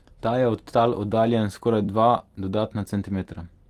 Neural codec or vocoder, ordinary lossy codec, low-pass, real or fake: vocoder, 44.1 kHz, 128 mel bands every 256 samples, BigVGAN v2; Opus, 24 kbps; 14.4 kHz; fake